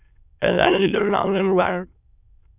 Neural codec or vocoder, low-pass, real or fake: autoencoder, 22.05 kHz, a latent of 192 numbers a frame, VITS, trained on many speakers; 3.6 kHz; fake